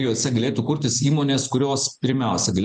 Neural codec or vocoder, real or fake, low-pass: none; real; 9.9 kHz